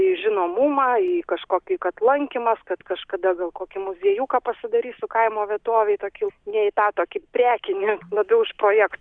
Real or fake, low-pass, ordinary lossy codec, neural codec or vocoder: real; 9.9 kHz; Opus, 64 kbps; none